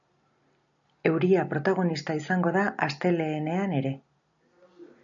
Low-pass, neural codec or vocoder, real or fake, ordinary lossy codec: 7.2 kHz; none; real; MP3, 96 kbps